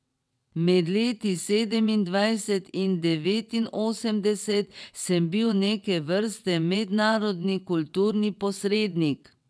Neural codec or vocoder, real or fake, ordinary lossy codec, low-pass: vocoder, 22.05 kHz, 80 mel bands, WaveNeXt; fake; none; none